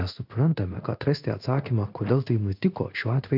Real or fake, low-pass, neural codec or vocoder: fake; 5.4 kHz; codec, 16 kHz in and 24 kHz out, 1 kbps, XY-Tokenizer